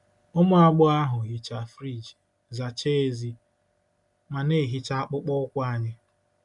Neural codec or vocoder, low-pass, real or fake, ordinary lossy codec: none; 10.8 kHz; real; MP3, 96 kbps